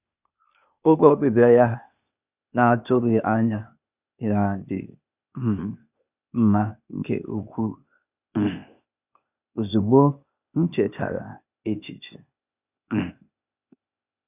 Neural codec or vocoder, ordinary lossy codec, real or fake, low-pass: codec, 16 kHz, 0.8 kbps, ZipCodec; none; fake; 3.6 kHz